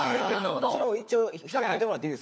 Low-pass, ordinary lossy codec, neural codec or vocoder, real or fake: none; none; codec, 16 kHz, 4 kbps, FunCodec, trained on LibriTTS, 50 frames a second; fake